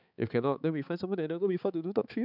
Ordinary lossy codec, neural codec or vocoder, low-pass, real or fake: none; codec, 16 kHz, 4 kbps, X-Codec, HuBERT features, trained on balanced general audio; 5.4 kHz; fake